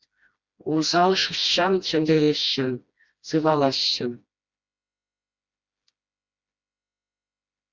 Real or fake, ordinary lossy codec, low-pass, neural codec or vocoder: fake; Opus, 64 kbps; 7.2 kHz; codec, 16 kHz, 1 kbps, FreqCodec, smaller model